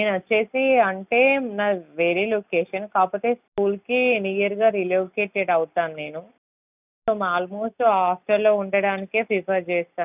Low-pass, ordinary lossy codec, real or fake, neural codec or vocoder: 3.6 kHz; none; real; none